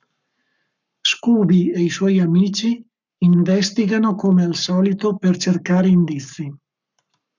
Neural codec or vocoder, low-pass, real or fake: codec, 44.1 kHz, 7.8 kbps, Pupu-Codec; 7.2 kHz; fake